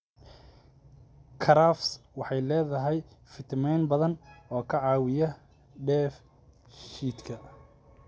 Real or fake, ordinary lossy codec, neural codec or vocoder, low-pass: real; none; none; none